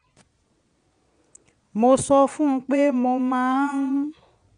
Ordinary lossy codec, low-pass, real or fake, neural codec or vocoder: none; 9.9 kHz; fake; vocoder, 22.05 kHz, 80 mel bands, Vocos